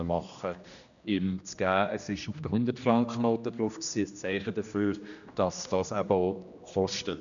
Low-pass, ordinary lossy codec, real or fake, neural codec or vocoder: 7.2 kHz; none; fake; codec, 16 kHz, 1 kbps, X-Codec, HuBERT features, trained on general audio